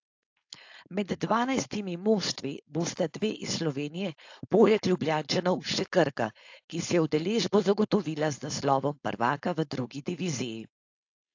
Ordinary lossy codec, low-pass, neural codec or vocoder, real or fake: AAC, 48 kbps; 7.2 kHz; codec, 16 kHz, 4.8 kbps, FACodec; fake